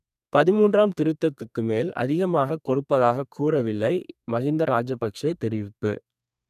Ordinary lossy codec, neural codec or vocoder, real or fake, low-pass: none; codec, 32 kHz, 1.9 kbps, SNAC; fake; 14.4 kHz